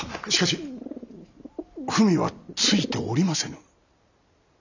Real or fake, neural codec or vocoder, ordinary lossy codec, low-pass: real; none; none; 7.2 kHz